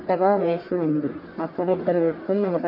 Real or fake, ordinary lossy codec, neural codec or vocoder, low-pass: fake; MP3, 32 kbps; codec, 44.1 kHz, 1.7 kbps, Pupu-Codec; 5.4 kHz